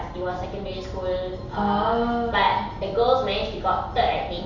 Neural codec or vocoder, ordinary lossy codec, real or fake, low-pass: none; none; real; 7.2 kHz